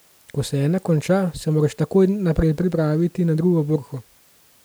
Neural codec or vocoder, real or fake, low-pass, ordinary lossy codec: none; real; none; none